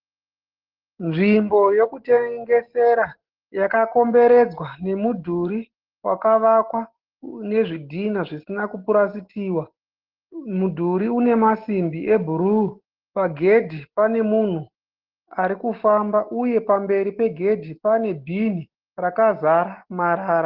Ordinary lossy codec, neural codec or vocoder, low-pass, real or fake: Opus, 16 kbps; none; 5.4 kHz; real